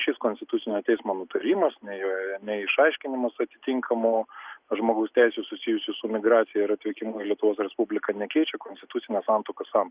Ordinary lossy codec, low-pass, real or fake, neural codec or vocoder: Opus, 64 kbps; 3.6 kHz; real; none